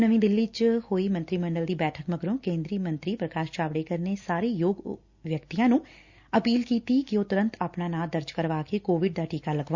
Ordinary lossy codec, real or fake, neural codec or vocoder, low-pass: Opus, 64 kbps; real; none; 7.2 kHz